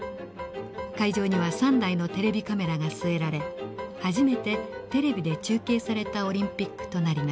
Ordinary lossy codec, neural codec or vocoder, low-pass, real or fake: none; none; none; real